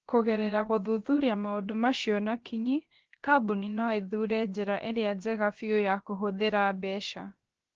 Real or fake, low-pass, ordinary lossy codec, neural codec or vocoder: fake; 7.2 kHz; Opus, 16 kbps; codec, 16 kHz, about 1 kbps, DyCAST, with the encoder's durations